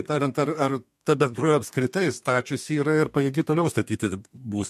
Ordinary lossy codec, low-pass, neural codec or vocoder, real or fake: MP3, 64 kbps; 14.4 kHz; codec, 32 kHz, 1.9 kbps, SNAC; fake